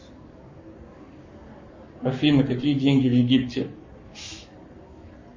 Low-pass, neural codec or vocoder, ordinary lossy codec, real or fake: 7.2 kHz; codec, 44.1 kHz, 7.8 kbps, Pupu-Codec; MP3, 32 kbps; fake